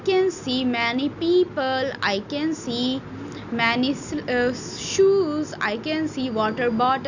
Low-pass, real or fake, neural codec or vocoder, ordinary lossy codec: 7.2 kHz; real; none; none